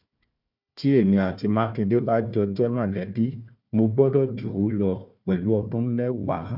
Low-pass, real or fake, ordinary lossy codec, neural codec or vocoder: 5.4 kHz; fake; none; codec, 16 kHz, 1 kbps, FunCodec, trained on Chinese and English, 50 frames a second